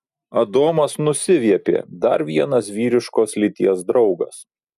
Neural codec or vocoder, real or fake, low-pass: none; real; 14.4 kHz